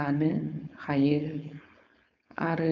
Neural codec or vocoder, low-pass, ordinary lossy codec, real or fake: codec, 16 kHz, 4.8 kbps, FACodec; 7.2 kHz; none; fake